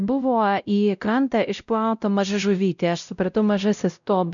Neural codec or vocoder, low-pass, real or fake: codec, 16 kHz, 0.5 kbps, X-Codec, WavLM features, trained on Multilingual LibriSpeech; 7.2 kHz; fake